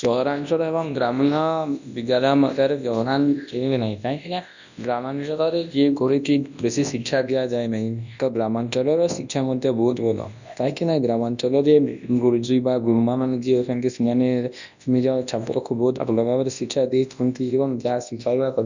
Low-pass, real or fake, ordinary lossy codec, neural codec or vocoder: 7.2 kHz; fake; none; codec, 24 kHz, 0.9 kbps, WavTokenizer, large speech release